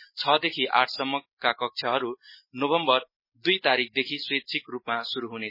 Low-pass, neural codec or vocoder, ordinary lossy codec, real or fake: 5.4 kHz; none; MP3, 24 kbps; real